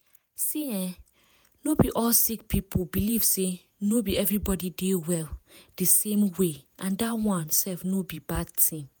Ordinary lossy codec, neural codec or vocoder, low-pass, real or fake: none; vocoder, 48 kHz, 128 mel bands, Vocos; none; fake